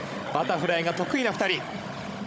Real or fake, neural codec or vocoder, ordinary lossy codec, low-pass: fake; codec, 16 kHz, 16 kbps, FunCodec, trained on Chinese and English, 50 frames a second; none; none